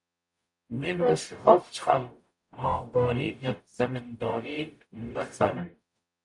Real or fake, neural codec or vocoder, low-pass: fake; codec, 44.1 kHz, 0.9 kbps, DAC; 10.8 kHz